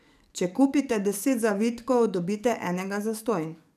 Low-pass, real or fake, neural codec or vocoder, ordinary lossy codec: 14.4 kHz; fake; codec, 44.1 kHz, 7.8 kbps, DAC; none